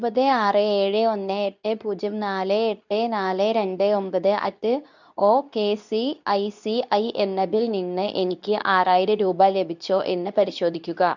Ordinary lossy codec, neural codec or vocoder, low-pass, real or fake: none; codec, 24 kHz, 0.9 kbps, WavTokenizer, medium speech release version 2; 7.2 kHz; fake